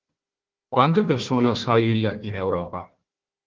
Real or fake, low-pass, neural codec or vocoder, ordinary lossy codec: fake; 7.2 kHz; codec, 16 kHz, 1 kbps, FunCodec, trained on Chinese and English, 50 frames a second; Opus, 16 kbps